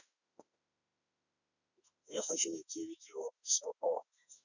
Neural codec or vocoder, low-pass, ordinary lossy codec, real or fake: autoencoder, 48 kHz, 32 numbers a frame, DAC-VAE, trained on Japanese speech; 7.2 kHz; none; fake